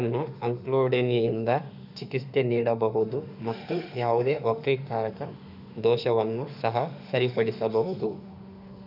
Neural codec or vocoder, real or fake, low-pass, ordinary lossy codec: autoencoder, 48 kHz, 32 numbers a frame, DAC-VAE, trained on Japanese speech; fake; 5.4 kHz; none